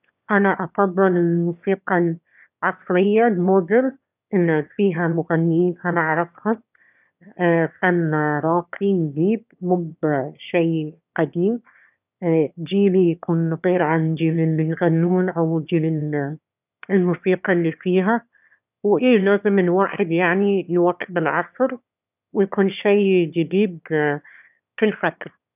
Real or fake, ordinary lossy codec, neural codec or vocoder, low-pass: fake; none; autoencoder, 22.05 kHz, a latent of 192 numbers a frame, VITS, trained on one speaker; 3.6 kHz